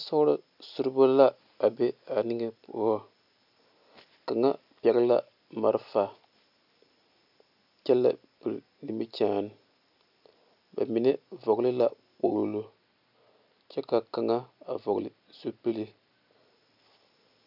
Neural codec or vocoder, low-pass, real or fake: none; 5.4 kHz; real